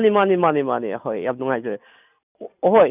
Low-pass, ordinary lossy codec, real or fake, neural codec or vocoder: 3.6 kHz; none; real; none